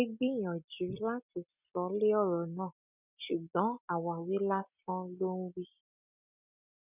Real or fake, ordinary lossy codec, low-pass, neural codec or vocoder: real; none; 3.6 kHz; none